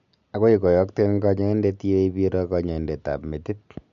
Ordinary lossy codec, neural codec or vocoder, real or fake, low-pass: none; none; real; 7.2 kHz